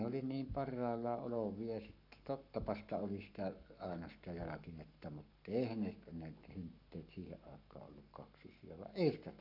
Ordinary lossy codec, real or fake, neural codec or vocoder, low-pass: none; fake; codec, 44.1 kHz, 7.8 kbps, Pupu-Codec; 5.4 kHz